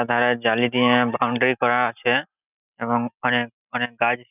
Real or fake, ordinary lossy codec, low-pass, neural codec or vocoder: real; none; 3.6 kHz; none